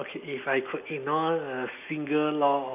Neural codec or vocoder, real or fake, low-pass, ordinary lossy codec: none; real; 3.6 kHz; none